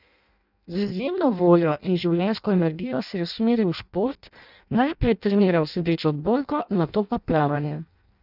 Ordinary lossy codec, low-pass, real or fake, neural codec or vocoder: none; 5.4 kHz; fake; codec, 16 kHz in and 24 kHz out, 0.6 kbps, FireRedTTS-2 codec